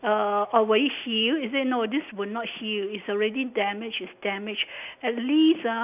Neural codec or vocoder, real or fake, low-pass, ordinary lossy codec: none; real; 3.6 kHz; none